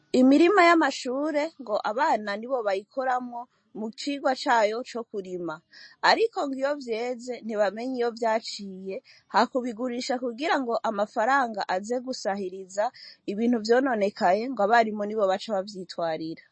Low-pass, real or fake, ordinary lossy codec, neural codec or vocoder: 9.9 kHz; real; MP3, 32 kbps; none